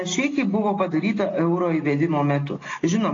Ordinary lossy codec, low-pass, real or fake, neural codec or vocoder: AAC, 32 kbps; 7.2 kHz; real; none